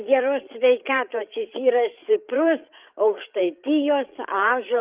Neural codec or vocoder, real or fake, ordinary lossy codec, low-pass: codec, 16 kHz, 8 kbps, FreqCodec, larger model; fake; Opus, 32 kbps; 3.6 kHz